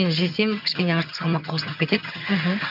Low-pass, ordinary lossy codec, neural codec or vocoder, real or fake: 5.4 kHz; AAC, 48 kbps; vocoder, 22.05 kHz, 80 mel bands, HiFi-GAN; fake